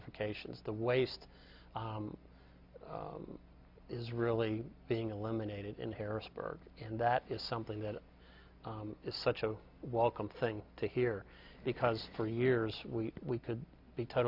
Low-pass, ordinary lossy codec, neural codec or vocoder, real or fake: 5.4 kHz; MP3, 48 kbps; none; real